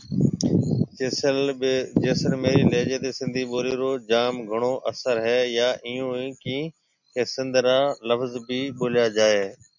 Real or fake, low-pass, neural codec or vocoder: real; 7.2 kHz; none